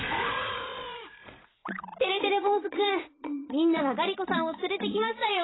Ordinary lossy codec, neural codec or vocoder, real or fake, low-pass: AAC, 16 kbps; vocoder, 44.1 kHz, 80 mel bands, Vocos; fake; 7.2 kHz